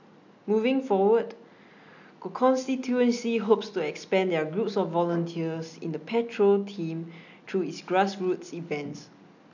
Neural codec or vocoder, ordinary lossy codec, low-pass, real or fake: none; none; 7.2 kHz; real